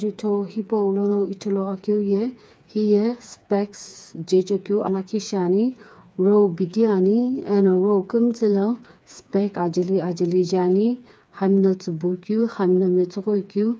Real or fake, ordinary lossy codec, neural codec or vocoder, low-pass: fake; none; codec, 16 kHz, 4 kbps, FreqCodec, smaller model; none